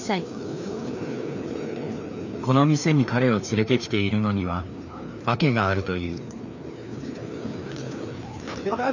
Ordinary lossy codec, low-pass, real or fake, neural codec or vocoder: none; 7.2 kHz; fake; codec, 16 kHz, 2 kbps, FreqCodec, larger model